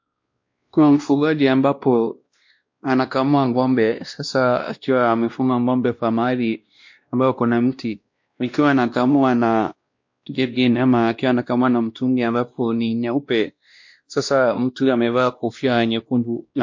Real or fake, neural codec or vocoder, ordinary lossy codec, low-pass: fake; codec, 16 kHz, 1 kbps, X-Codec, WavLM features, trained on Multilingual LibriSpeech; MP3, 48 kbps; 7.2 kHz